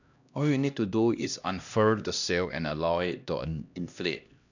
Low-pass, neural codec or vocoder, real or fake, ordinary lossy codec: 7.2 kHz; codec, 16 kHz, 1 kbps, X-Codec, HuBERT features, trained on LibriSpeech; fake; none